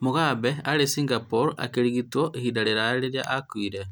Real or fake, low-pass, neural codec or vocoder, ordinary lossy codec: real; none; none; none